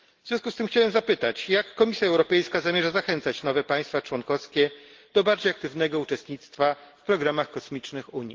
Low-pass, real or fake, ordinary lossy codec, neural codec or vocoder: 7.2 kHz; real; Opus, 16 kbps; none